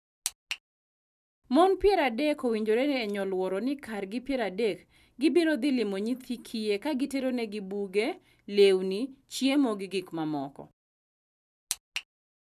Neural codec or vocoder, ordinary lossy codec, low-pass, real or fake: none; none; 14.4 kHz; real